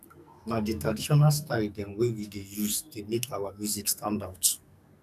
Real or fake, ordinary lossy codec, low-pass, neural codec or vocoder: fake; none; 14.4 kHz; codec, 44.1 kHz, 2.6 kbps, SNAC